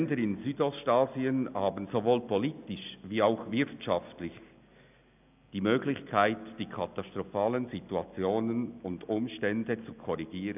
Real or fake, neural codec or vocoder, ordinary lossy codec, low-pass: real; none; none; 3.6 kHz